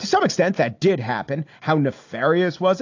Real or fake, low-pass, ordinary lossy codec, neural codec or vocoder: real; 7.2 kHz; AAC, 48 kbps; none